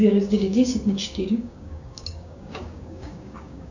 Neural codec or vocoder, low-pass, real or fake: codec, 16 kHz, 6 kbps, DAC; 7.2 kHz; fake